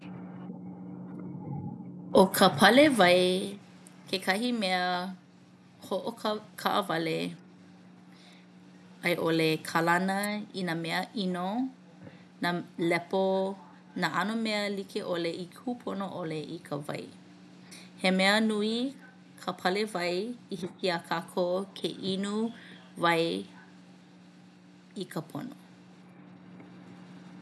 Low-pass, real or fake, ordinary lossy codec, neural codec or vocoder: none; real; none; none